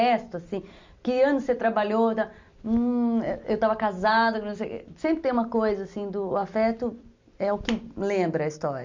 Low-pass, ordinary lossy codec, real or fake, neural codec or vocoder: 7.2 kHz; MP3, 64 kbps; real; none